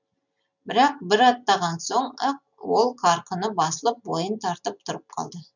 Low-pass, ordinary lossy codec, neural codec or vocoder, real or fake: 7.2 kHz; none; none; real